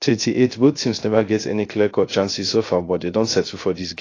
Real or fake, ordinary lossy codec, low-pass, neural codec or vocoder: fake; AAC, 32 kbps; 7.2 kHz; codec, 16 kHz, 0.3 kbps, FocalCodec